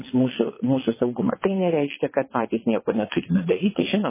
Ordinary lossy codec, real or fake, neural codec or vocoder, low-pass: MP3, 16 kbps; fake; vocoder, 22.05 kHz, 80 mel bands, WaveNeXt; 3.6 kHz